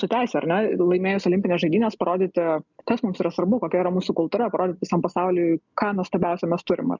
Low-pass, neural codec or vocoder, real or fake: 7.2 kHz; none; real